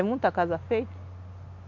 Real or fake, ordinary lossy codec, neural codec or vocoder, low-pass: real; none; none; 7.2 kHz